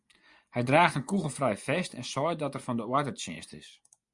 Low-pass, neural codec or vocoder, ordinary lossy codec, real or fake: 10.8 kHz; vocoder, 24 kHz, 100 mel bands, Vocos; Opus, 64 kbps; fake